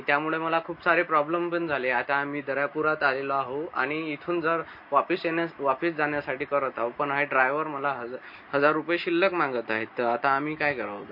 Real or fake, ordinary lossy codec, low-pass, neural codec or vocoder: real; MP3, 32 kbps; 5.4 kHz; none